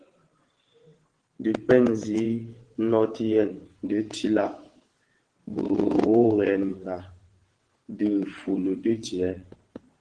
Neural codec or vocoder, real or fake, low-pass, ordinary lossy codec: vocoder, 22.05 kHz, 80 mel bands, WaveNeXt; fake; 9.9 kHz; Opus, 16 kbps